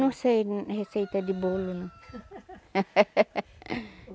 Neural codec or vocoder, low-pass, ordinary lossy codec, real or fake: none; none; none; real